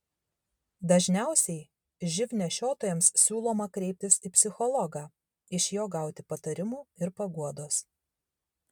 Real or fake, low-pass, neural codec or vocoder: real; 19.8 kHz; none